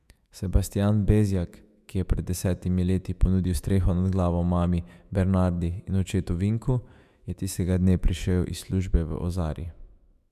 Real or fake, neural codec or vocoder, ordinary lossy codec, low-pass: fake; autoencoder, 48 kHz, 128 numbers a frame, DAC-VAE, trained on Japanese speech; MP3, 96 kbps; 14.4 kHz